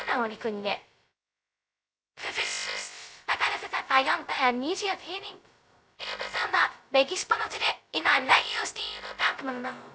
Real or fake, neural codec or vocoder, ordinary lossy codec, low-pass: fake; codec, 16 kHz, 0.2 kbps, FocalCodec; none; none